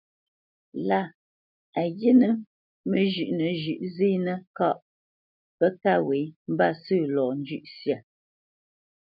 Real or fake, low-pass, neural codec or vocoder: real; 5.4 kHz; none